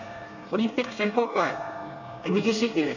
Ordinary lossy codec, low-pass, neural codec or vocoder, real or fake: none; 7.2 kHz; codec, 24 kHz, 1 kbps, SNAC; fake